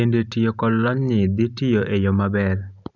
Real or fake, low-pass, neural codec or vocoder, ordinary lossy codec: real; 7.2 kHz; none; none